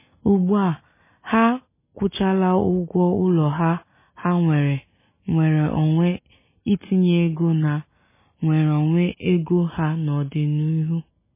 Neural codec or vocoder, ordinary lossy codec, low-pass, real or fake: none; MP3, 16 kbps; 3.6 kHz; real